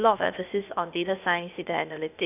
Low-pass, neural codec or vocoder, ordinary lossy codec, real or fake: 3.6 kHz; codec, 16 kHz, 0.8 kbps, ZipCodec; none; fake